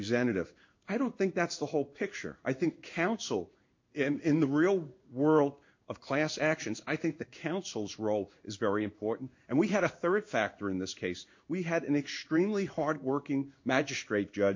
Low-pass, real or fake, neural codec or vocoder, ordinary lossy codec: 7.2 kHz; fake; codec, 16 kHz in and 24 kHz out, 1 kbps, XY-Tokenizer; MP3, 48 kbps